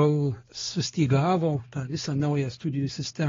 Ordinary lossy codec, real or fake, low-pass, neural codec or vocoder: AAC, 24 kbps; fake; 7.2 kHz; codec, 16 kHz, 2 kbps, X-Codec, HuBERT features, trained on balanced general audio